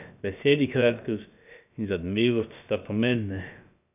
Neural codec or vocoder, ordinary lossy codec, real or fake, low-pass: codec, 16 kHz, about 1 kbps, DyCAST, with the encoder's durations; none; fake; 3.6 kHz